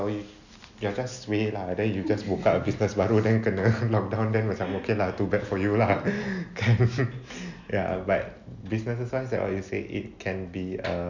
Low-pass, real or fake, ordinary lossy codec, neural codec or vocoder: 7.2 kHz; real; none; none